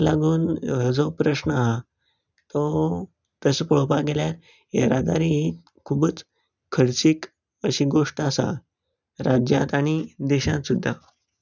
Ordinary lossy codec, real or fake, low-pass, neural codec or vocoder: none; real; 7.2 kHz; none